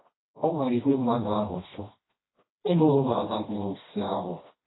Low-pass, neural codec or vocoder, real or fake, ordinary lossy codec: 7.2 kHz; codec, 16 kHz, 1 kbps, FreqCodec, smaller model; fake; AAC, 16 kbps